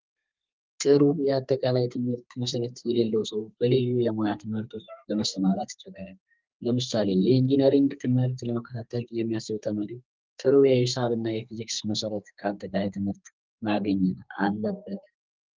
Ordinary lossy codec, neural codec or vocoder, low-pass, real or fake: Opus, 24 kbps; codec, 32 kHz, 1.9 kbps, SNAC; 7.2 kHz; fake